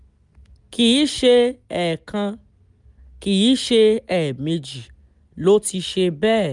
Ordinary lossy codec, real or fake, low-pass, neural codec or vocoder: none; real; 10.8 kHz; none